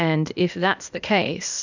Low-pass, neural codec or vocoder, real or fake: 7.2 kHz; codec, 16 kHz, 0.8 kbps, ZipCodec; fake